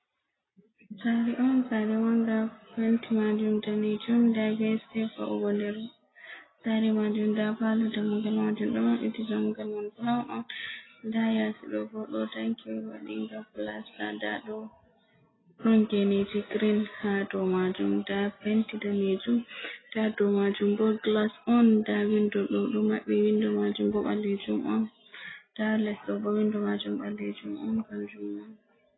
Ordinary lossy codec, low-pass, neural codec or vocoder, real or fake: AAC, 16 kbps; 7.2 kHz; none; real